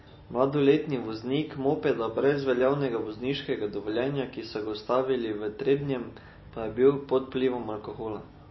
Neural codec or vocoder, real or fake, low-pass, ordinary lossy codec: none; real; 7.2 kHz; MP3, 24 kbps